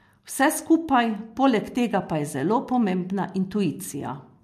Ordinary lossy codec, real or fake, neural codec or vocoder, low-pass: MP3, 64 kbps; real; none; 14.4 kHz